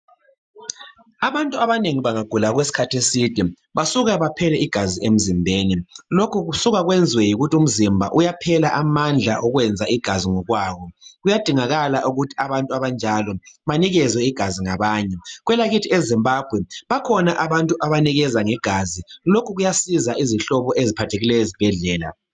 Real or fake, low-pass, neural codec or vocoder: real; 9.9 kHz; none